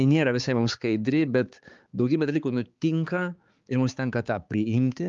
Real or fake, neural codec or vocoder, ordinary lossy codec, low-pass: fake; codec, 16 kHz, 4 kbps, X-Codec, HuBERT features, trained on balanced general audio; Opus, 32 kbps; 7.2 kHz